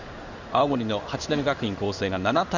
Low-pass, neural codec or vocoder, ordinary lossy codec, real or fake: 7.2 kHz; codec, 16 kHz in and 24 kHz out, 1 kbps, XY-Tokenizer; none; fake